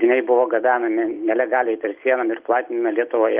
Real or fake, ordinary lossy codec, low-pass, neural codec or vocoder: real; Opus, 32 kbps; 3.6 kHz; none